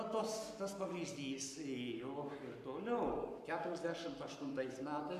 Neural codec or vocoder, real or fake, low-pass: codec, 44.1 kHz, 7.8 kbps, Pupu-Codec; fake; 14.4 kHz